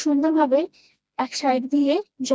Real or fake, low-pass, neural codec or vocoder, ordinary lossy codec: fake; none; codec, 16 kHz, 1 kbps, FreqCodec, smaller model; none